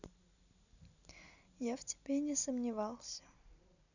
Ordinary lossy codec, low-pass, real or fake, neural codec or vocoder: none; 7.2 kHz; real; none